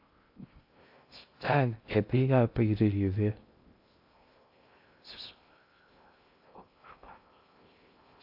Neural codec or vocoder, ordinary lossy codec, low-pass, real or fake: codec, 16 kHz in and 24 kHz out, 0.6 kbps, FocalCodec, streaming, 2048 codes; AAC, 48 kbps; 5.4 kHz; fake